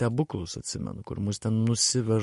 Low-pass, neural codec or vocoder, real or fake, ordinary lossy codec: 14.4 kHz; none; real; MP3, 48 kbps